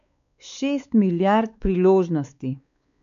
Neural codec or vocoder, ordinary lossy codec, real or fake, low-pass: codec, 16 kHz, 4 kbps, X-Codec, WavLM features, trained on Multilingual LibriSpeech; MP3, 96 kbps; fake; 7.2 kHz